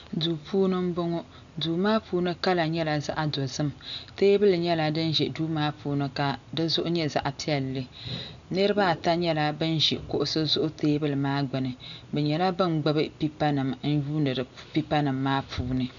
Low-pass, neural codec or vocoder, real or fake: 7.2 kHz; none; real